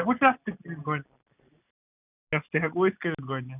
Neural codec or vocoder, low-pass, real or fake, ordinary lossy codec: none; 3.6 kHz; real; none